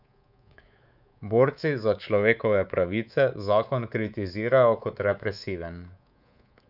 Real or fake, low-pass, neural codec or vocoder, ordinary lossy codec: fake; 5.4 kHz; codec, 24 kHz, 3.1 kbps, DualCodec; none